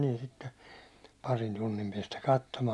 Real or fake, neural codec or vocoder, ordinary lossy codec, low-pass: real; none; none; none